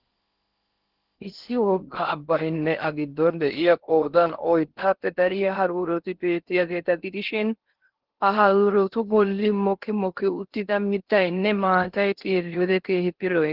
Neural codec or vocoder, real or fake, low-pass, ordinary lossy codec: codec, 16 kHz in and 24 kHz out, 0.6 kbps, FocalCodec, streaming, 4096 codes; fake; 5.4 kHz; Opus, 16 kbps